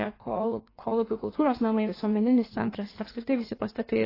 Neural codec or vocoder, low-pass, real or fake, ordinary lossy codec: codec, 16 kHz in and 24 kHz out, 1.1 kbps, FireRedTTS-2 codec; 5.4 kHz; fake; AAC, 24 kbps